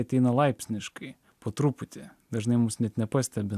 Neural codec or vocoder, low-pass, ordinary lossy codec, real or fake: none; 14.4 kHz; AAC, 96 kbps; real